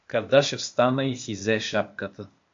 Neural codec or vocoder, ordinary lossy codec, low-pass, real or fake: codec, 16 kHz, 0.8 kbps, ZipCodec; MP3, 48 kbps; 7.2 kHz; fake